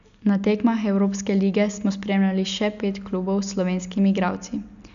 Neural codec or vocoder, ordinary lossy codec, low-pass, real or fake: none; none; 7.2 kHz; real